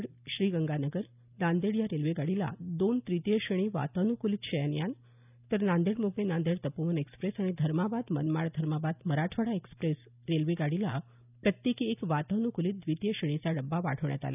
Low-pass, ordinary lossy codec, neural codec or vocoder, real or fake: 3.6 kHz; none; none; real